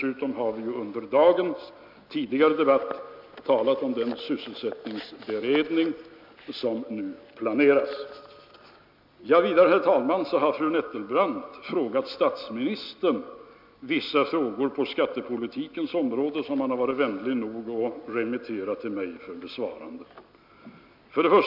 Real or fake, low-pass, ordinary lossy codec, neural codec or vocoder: real; 5.4 kHz; MP3, 48 kbps; none